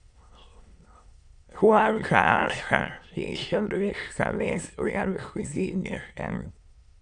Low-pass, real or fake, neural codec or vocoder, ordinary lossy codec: 9.9 kHz; fake; autoencoder, 22.05 kHz, a latent of 192 numbers a frame, VITS, trained on many speakers; Opus, 64 kbps